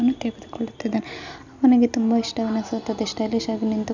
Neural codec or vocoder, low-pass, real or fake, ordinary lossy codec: none; 7.2 kHz; real; none